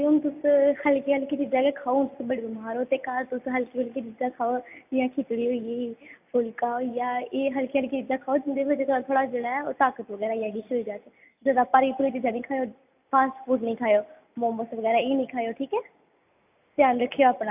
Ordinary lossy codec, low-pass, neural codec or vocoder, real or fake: AAC, 32 kbps; 3.6 kHz; none; real